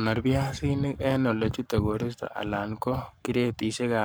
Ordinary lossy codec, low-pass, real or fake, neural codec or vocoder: none; none; fake; codec, 44.1 kHz, 7.8 kbps, Pupu-Codec